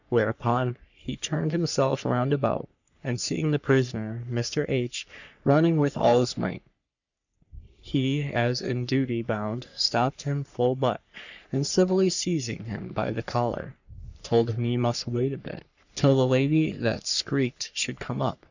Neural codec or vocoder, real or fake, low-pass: codec, 44.1 kHz, 3.4 kbps, Pupu-Codec; fake; 7.2 kHz